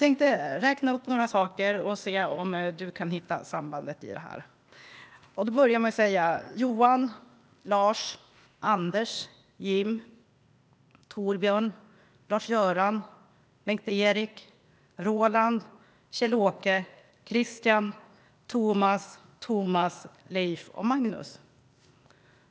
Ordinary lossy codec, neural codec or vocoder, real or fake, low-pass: none; codec, 16 kHz, 0.8 kbps, ZipCodec; fake; none